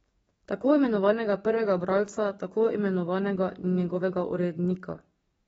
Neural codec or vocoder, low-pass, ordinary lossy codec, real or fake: codec, 44.1 kHz, 7.8 kbps, DAC; 19.8 kHz; AAC, 24 kbps; fake